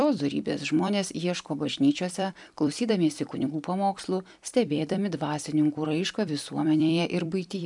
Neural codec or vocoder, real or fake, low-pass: vocoder, 44.1 kHz, 128 mel bands every 256 samples, BigVGAN v2; fake; 10.8 kHz